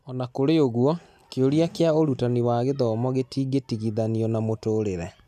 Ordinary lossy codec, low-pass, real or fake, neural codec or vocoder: none; 14.4 kHz; real; none